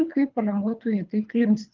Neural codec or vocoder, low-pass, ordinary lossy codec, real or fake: codec, 24 kHz, 3 kbps, HILCodec; 7.2 kHz; Opus, 24 kbps; fake